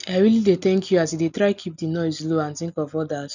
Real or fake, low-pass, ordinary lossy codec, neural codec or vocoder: real; 7.2 kHz; none; none